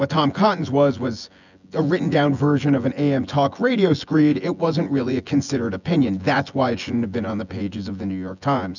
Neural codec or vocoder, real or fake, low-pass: vocoder, 24 kHz, 100 mel bands, Vocos; fake; 7.2 kHz